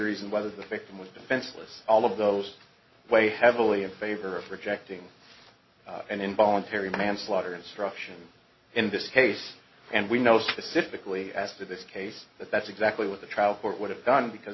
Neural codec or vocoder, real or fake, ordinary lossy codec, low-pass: none; real; MP3, 24 kbps; 7.2 kHz